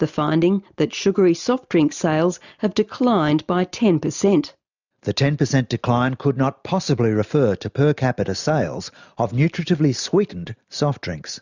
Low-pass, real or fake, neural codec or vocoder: 7.2 kHz; real; none